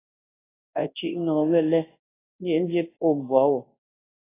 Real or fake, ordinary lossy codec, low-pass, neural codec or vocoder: fake; AAC, 16 kbps; 3.6 kHz; codec, 24 kHz, 0.9 kbps, WavTokenizer, large speech release